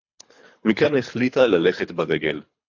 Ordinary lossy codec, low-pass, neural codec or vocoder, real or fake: AAC, 48 kbps; 7.2 kHz; codec, 24 kHz, 3 kbps, HILCodec; fake